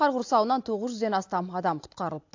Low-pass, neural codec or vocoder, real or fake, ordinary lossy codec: 7.2 kHz; none; real; AAC, 48 kbps